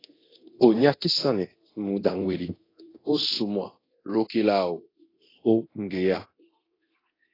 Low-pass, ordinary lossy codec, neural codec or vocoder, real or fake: 5.4 kHz; AAC, 24 kbps; codec, 24 kHz, 0.9 kbps, DualCodec; fake